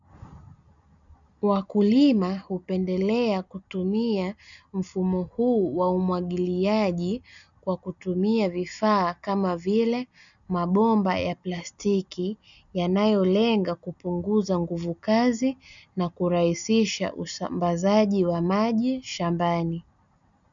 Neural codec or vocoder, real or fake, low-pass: none; real; 7.2 kHz